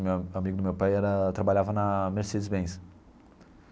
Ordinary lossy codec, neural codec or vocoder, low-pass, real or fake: none; none; none; real